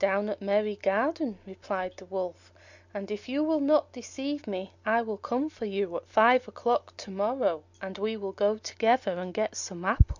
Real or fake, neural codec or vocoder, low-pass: real; none; 7.2 kHz